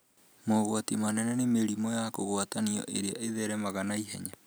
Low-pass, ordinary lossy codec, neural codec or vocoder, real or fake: none; none; none; real